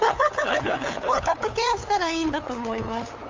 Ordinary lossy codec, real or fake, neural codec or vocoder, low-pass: Opus, 32 kbps; fake; codec, 16 kHz, 8 kbps, FunCodec, trained on LibriTTS, 25 frames a second; 7.2 kHz